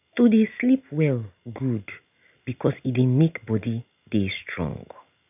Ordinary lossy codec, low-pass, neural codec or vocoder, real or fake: none; 3.6 kHz; none; real